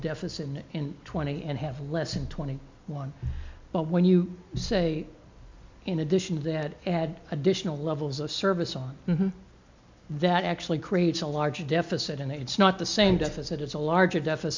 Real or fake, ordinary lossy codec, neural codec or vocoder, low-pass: real; MP3, 64 kbps; none; 7.2 kHz